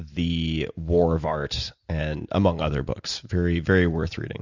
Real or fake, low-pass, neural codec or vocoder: real; 7.2 kHz; none